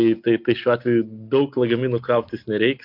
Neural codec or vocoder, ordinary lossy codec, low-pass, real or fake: none; MP3, 48 kbps; 5.4 kHz; real